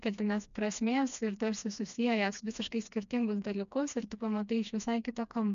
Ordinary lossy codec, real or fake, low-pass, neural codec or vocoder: MP3, 96 kbps; fake; 7.2 kHz; codec, 16 kHz, 2 kbps, FreqCodec, smaller model